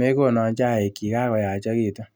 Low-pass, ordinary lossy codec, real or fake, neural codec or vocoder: none; none; real; none